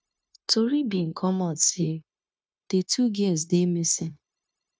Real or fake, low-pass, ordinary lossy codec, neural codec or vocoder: fake; none; none; codec, 16 kHz, 0.9 kbps, LongCat-Audio-Codec